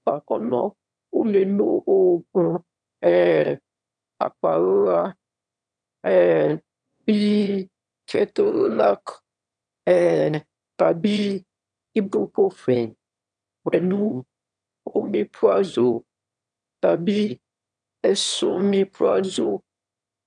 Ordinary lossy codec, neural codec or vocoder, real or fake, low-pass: none; autoencoder, 22.05 kHz, a latent of 192 numbers a frame, VITS, trained on one speaker; fake; 9.9 kHz